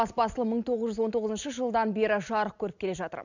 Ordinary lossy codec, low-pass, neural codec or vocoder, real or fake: none; 7.2 kHz; none; real